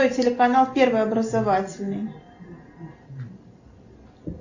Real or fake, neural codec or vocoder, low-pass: real; none; 7.2 kHz